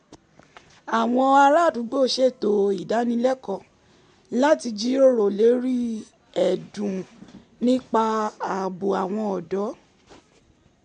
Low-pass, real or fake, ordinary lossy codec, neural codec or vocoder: 10.8 kHz; real; MP3, 64 kbps; none